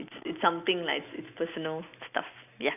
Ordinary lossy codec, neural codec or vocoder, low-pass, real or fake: none; none; 3.6 kHz; real